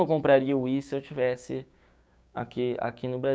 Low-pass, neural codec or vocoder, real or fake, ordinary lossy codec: none; codec, 16 kHz, 6 kbps, DAC; fake; none